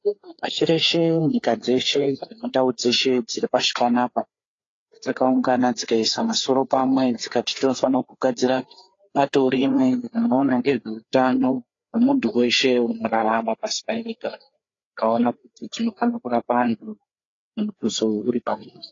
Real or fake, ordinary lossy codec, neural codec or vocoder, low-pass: fake; AAC, 32 kbps; codec, 16 kHz, 4 kbps, FreqCodec, larger model; 7.2 kHz